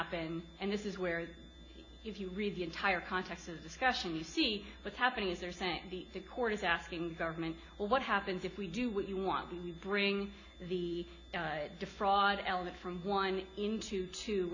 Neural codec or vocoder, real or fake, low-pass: none; real; 7.2 kHz